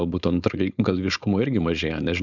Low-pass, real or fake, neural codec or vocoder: 7.2 kHz; fake; codec, 16 kHz, 4.8 kbps, FACodec